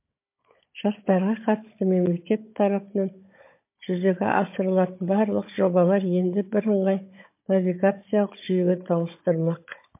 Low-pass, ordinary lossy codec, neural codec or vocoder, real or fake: 3.6 kHz; MP3, 24 kbps; codec, 16 kHz, 16 kbps, FunCodec, trained on Chinese and English, 50 frames a second; fake